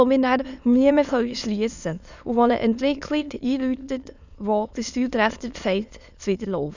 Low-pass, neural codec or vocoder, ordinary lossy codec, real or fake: 7.2 kHz; autoencoder, 22.05 kHz, a latent of 192 numbers a frame, VITS, trained on many speakers; none; fake